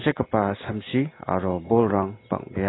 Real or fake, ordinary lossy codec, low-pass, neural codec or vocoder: real; AAC, 16 kbps; 7.2 kHz; none